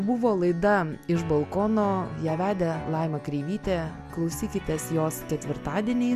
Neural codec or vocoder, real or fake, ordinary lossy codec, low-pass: none; real; MP3, 96 kbps; 14.4 kHz